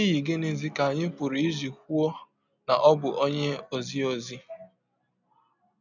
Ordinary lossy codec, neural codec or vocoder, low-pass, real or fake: none; none; 7.2 kHz; real